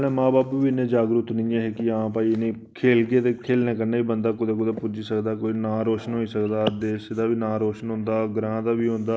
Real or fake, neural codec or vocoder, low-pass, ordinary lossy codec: real; none; none; none